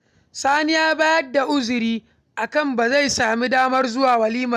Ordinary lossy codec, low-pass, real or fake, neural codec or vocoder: none; 14.4 kHz; real; none